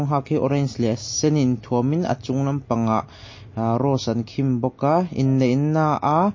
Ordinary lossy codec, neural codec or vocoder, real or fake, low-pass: MP3, 32 kbps; none; real; 7.2 kHz